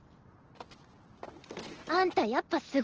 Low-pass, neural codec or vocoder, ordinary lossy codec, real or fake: 7.2 kHz; none; Opus, 16 kbps; real